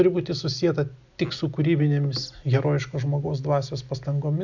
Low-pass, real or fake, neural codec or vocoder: 7.2 kHz; real; none